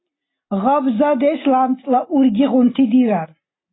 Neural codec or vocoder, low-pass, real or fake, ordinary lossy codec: none; 7.2 kHz; real; AAC, 16 kbps